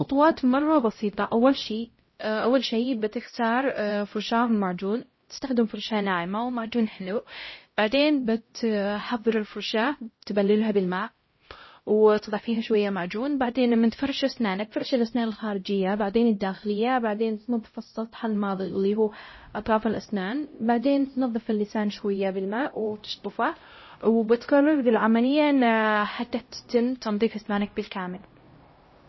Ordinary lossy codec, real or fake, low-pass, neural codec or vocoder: MP3, 24 kbps; fake; 7.2 kHz; codec, 16 kHz, 0.5 kbps, X-Codec, HuBERT features, trained on LibriSpeech